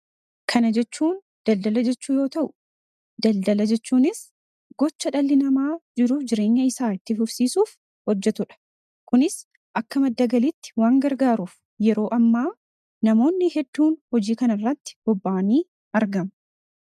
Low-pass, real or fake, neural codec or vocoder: 14.4 kHz; real; none